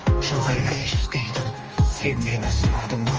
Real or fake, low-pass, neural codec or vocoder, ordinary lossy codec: fake; 7.2 kHz; codec, 44.1 kHz, 2.6 kbps, DAC; Opus, 24 kbps